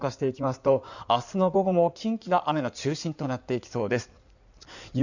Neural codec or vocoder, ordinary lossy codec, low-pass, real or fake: codec, 16 kHz in and 24 kHz out, 2.2 kbps, FireRedTTS-2 codec; none; 7.2 kHz; fake